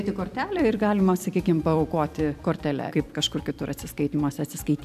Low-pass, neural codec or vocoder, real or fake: 14.4 kHz; vocoder, 44.1 kHz, 128 mel bands every 256 samples, BigVGAN v2; fake